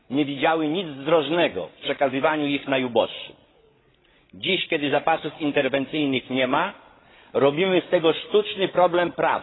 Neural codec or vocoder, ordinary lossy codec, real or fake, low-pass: codec, 16 kHz, 16 kbps, FreqCodec, larger model; AAC, 16 kbps; fake; 7.2 kHz